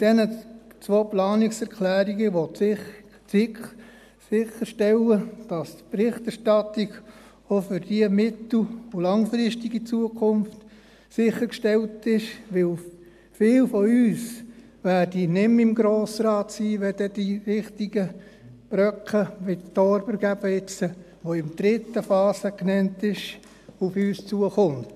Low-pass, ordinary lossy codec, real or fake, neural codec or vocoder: 14.4 kHz; AAC, 96 kbps; real; none